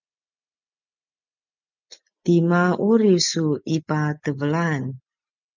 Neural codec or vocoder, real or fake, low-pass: none; real; 7.2 kHz